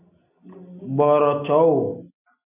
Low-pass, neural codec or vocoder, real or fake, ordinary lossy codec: 3.6 kHz; none; real; MP3, 24 kbps